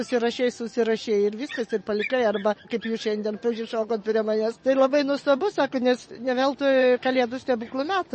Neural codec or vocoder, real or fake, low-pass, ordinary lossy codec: vocoder, 24 kHz, 100 mel bands, Vocos; fake; 10.8 kHz; MP3, 32 kbps